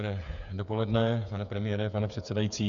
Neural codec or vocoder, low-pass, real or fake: codec, 16 kHz, 8 kbps, FreqCodec, smaller model; 7.2 kHz; fake